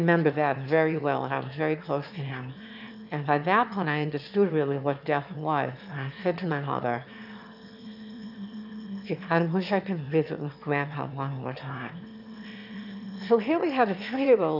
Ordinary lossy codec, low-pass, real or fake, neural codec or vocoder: AAC, 48 kbps; 5.4 kHz; fake; autoencoder, 22.05 kHz, a latent of 192 numbers a frame, VITS, trained on one speaker